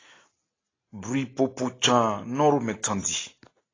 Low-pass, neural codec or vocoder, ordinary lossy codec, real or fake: 7.2 kHz; none; AAC, 32 kbps; real